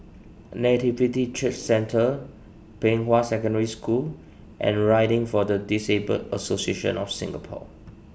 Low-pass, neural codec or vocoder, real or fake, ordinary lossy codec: none; none; real; none